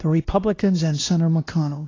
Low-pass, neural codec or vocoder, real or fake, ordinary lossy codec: 7.2 kHz; codec, 16 kHz, 1 kbps, X-Codec, WavLM features, trained on Multilingual LibriSpeech; fake; AAC, 32 kbps